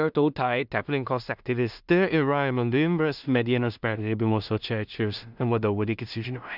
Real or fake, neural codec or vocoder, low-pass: fake; codec, 16 kHz in and 24 kHz out, 0.4 kbps, LongCat-Audio-Codec, two codebook decoder; 5.4 kHz